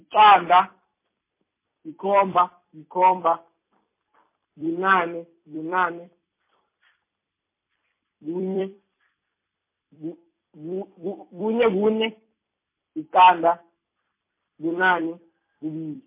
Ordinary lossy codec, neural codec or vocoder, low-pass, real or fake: MP3, 24 kbps; vocoder, 44.1 kHz, 128 mel bands every 256 samples, BigVGAN v2; 3.6 kHz; fake